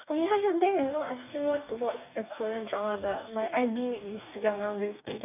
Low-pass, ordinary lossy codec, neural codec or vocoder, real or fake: 3.6 kHz; none; codec, 44.1 kHz, 2.6 kbps, DAC; fake